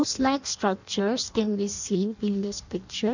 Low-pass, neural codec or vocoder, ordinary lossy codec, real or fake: 7.2 kHz; codec, 16 kHz in and 24 kHz out, 0.6 kbps, FireRedTTS-2 codec; none; fake